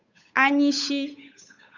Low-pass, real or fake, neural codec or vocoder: 7.2 kHz; fake; codec, 16 kHz, 8 kbps, FunCodec, trained on Chinese and English, 25 frames a second